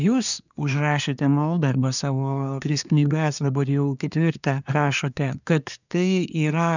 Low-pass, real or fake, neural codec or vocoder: 7.2 kHz; fake; codec, 24 kHz, 1 kbps, SNAC